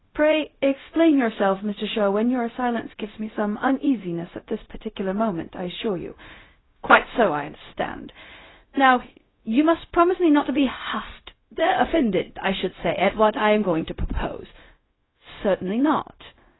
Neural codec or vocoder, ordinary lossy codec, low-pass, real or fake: codec, 16 kHz, 0.4 kbps, LongCat-Audio-Codec; AAC, 16 kbps; 7.2 kHz; fake